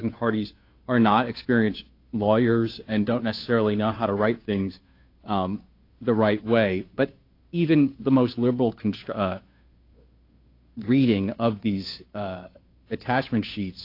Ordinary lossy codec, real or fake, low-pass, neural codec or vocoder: AAC, 32 kbps; fake; 5.4 kHz; codec, 16 kHz, 2 kbps, FunCodec, trained on Chinese and English, 25 frames a second